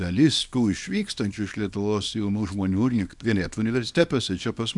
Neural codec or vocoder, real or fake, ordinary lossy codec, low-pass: codec, 24 kHz, 0.9 kbps, WavTokenizer, small release; fake; MP3, 96 kbps; 10.8 kHz